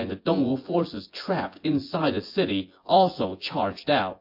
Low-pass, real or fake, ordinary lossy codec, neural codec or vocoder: 5.4 kHz; fake; MP3, 32 kbps; vocoder, 24 kHz, 100 mel bands, Vocos